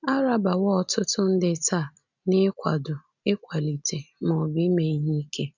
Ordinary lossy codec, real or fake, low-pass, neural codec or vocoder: none; real; 7.2 kHz; none